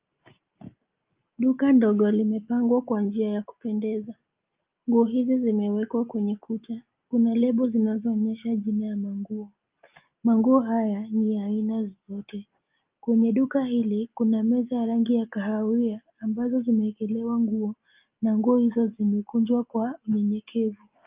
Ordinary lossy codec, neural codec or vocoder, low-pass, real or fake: Opus, 24 kbps; none; 3.6 kHz; real